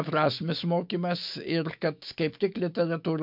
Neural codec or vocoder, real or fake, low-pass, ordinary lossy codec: autoencoder, 48 kHz, 128 numbers a frame, DAC-VAE, trained on Japanese speech; fake; 5.4 kHz; MP3, 48 kbps